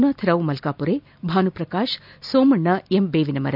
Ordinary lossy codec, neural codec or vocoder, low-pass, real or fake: none; none; 5.4 kHz; real